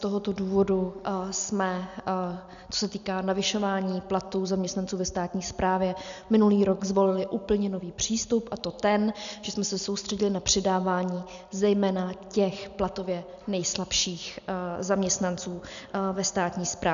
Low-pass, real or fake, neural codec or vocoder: 7.2 kHz; real; none